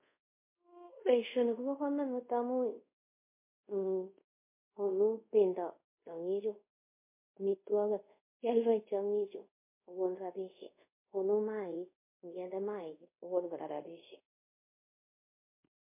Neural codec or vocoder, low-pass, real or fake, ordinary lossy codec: codec, 24 kHz, 0.5 kbps, DualCodec; 3.6 kHz; fake; MP3, 24 kbps